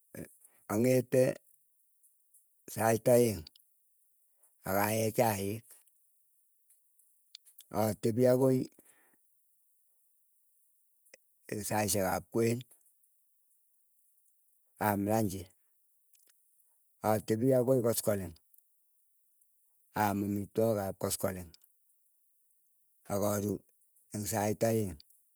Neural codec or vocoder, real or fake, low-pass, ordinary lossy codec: vocoder, 48 kHz, 128 mel bands, Vocos; fake; none; none